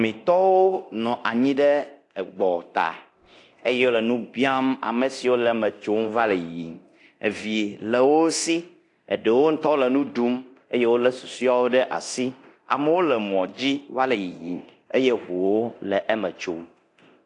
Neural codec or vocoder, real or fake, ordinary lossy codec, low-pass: codec, 24 kHz, 0.9 kbps, DualCodec; fake; AAC, 48 kbps; 10.8 kHz